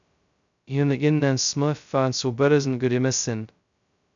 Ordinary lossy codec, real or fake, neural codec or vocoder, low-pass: MP3, 96 kbps; fake; codec, 16 kHz, 0.2 kbps, FocalCodec; 7.2 kHz